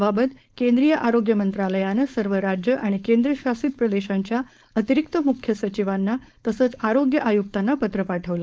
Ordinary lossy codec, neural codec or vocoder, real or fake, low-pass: none; codec, 16 kHz, 4.8 kbps, FACodec; fake; none